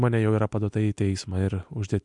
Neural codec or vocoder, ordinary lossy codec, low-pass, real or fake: none; MP3, 64 kbps; 10.8 kHz; real